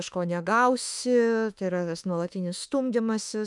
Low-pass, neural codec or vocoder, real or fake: 10.8 kHz; autoencoder, 48 kHz, 32 numbers a frame, DAC-VAE, trained on Japanese speech; fake